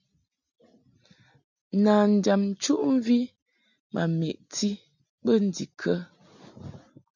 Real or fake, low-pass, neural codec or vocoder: real; 7.2 kHz; none